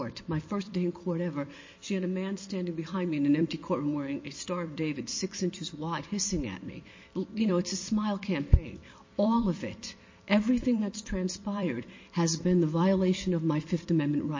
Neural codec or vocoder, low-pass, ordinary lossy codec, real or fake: vocoder, 44.1 kHz, 128 mel bands every 256 samples, BigVGAN v2; 7.2 kHz; MP3, 32 kbps; fake